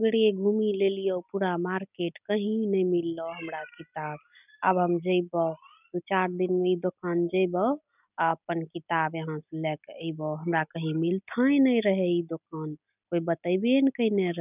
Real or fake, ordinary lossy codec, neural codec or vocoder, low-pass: real; none; none; 3.6 kHz